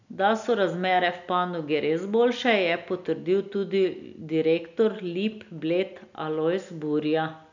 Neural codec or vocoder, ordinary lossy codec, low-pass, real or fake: none; none; 7.2 kHz; real